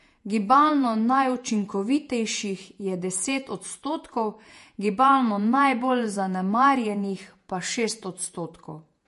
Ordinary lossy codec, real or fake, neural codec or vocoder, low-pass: MP3, 48 kbps; fake; vocoder, 48 kHz, 128 mel bands, Vocos; 14.4 kHz